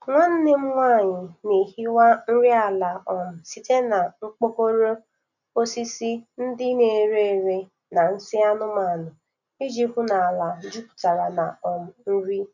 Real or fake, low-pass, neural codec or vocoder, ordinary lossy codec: real; 7.2 kHz; none; none